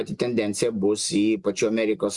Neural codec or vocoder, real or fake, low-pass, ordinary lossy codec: none; real; 10.8 kHz; Opus, 64 kbps